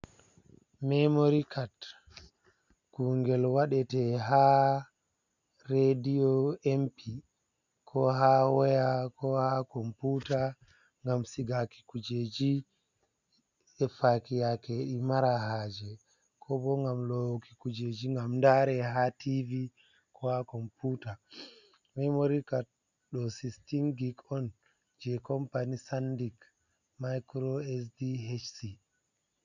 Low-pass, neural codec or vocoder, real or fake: 7.2 kHz; none; real